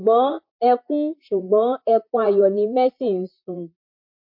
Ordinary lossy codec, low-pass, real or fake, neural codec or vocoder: MP3, 32 kbps; 5.4 kHz; fake; vocoder, 44.1 kHz, 128 mel bands, Pupu-Vocoder